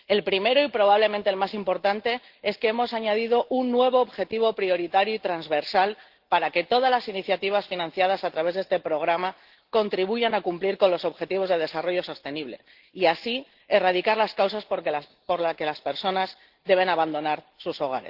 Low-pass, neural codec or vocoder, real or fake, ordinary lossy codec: 5.4 kHz; none; real; Opus, 16 kbps